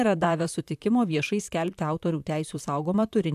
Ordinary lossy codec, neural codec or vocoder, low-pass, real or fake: AAC, 96 kbps; vocoder, 44.1 kHz, 128 mel bands, Pupu-Vocoder; 14.4 kHz; fake